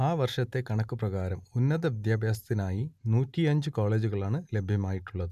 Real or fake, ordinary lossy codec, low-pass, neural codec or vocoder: real; none; 14.4 kHz; none